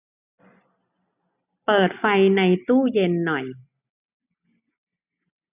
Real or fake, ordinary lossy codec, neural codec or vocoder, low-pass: real; none; none; 3.6 kHz